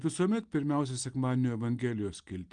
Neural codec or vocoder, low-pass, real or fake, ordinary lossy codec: none; 9.9 kHz; real; Opus, 24 kbps